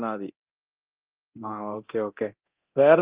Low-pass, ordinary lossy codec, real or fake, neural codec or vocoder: 3.6 kHz; Opus, 24 kbps; fake; codec, 24 kHz, 0.9 kbps, DualCodec